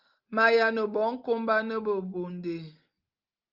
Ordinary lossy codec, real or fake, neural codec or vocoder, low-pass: Opus, 32 kbps; real; none; 5.4 kHz